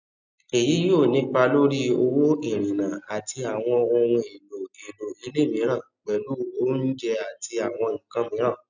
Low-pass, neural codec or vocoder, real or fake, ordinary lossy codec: 7.2 kHz; none; real; none